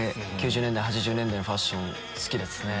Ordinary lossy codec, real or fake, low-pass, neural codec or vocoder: none; real; none; none